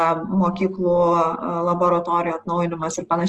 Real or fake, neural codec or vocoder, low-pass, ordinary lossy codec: real; none; 10.8 kHz; Opus, 16 kbps